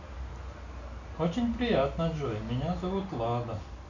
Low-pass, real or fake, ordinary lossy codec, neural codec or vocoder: 7.2 kHz; real; none; none